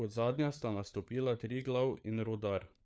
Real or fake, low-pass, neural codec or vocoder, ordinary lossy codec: fake; none; codec, 16 kHz, 4 kbps, FunCodec, trained on LibriTTS, 50 frames a second; none